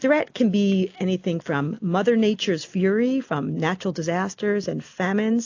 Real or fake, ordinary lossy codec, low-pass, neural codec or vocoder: real; AAC, 48 kbps; 7.2 kHz; none